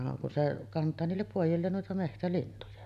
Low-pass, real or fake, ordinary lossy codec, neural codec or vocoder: 14.4 kHz; real; none; none